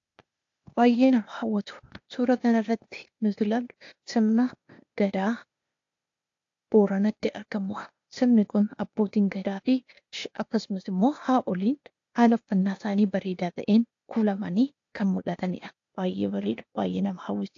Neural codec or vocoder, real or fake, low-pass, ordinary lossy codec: codec, 16 kHz, 0.8 kbps, ZipCodec; fake; 7.2 kHz; AAC, 48 kbps